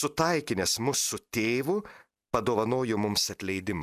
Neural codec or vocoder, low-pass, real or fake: none; 14.4 kHz; real